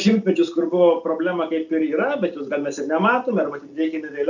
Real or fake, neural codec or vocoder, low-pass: real; none; 7.2 kHz